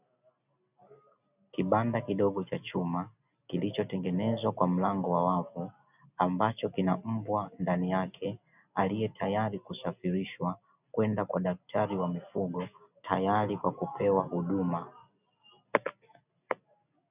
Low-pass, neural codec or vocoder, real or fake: 3.6 kHz; none; real